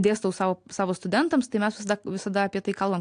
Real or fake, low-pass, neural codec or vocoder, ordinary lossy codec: real; 9.9 kHz; none; AAC, 64 kbps